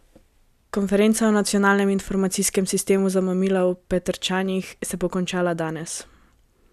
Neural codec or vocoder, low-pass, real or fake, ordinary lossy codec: none; 14.4 kHz; real; none